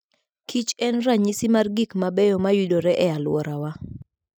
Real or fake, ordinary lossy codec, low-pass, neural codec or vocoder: real; none; none; none